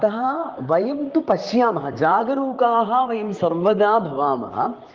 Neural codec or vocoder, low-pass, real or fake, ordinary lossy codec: vocoder, 44.1 kHz, 128 mel bands, Pupu-Vocoder; 7.2 kHz; fake; Opus, 32 kbps